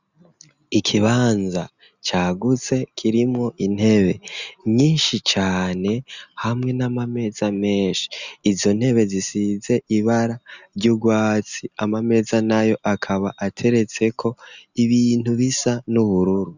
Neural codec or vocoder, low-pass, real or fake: none; 7.2 kHz; real